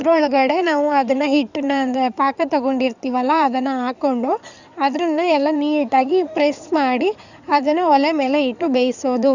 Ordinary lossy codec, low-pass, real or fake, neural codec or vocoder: none; 7.2 kHz; fake; codec, 16 kHz in and 24 kHz out, 2.2 kbps, FireRedTTS-2 codec